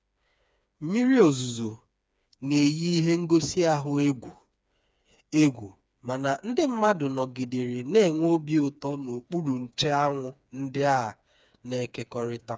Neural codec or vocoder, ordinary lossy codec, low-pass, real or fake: codec, 16 kHz, 4 kbps, FreqCodec, smaller model; none; none; fake